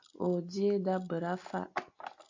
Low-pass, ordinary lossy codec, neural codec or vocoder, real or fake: 7.2 kHz; MP3, 48 kbps; none; real